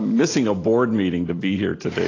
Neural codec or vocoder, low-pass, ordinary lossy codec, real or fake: none; 7.2 kHz; AAC, 32 kbps; real